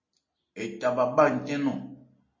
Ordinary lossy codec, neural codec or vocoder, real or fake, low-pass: MP3, 32 kbps; none; real; 7.2 kHz